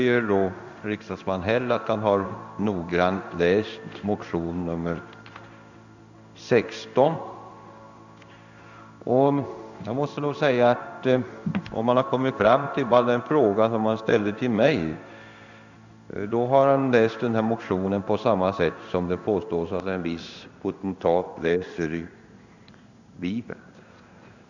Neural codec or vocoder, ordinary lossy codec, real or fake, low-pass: codec, 16 kHz in and 24 kHz out, 1 kbps, XY-Tokenizer; none; fake; 7.2 kHz